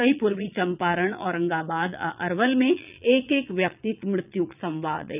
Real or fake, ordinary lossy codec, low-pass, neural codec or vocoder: fake; none; 3.6 kHz; vocoder, 22.05 kHz, 80 mel bands, Vocos